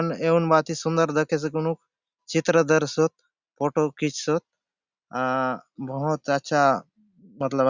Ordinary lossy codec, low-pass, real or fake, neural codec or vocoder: Opus, 64 kbps; 7.2 kHz; real; none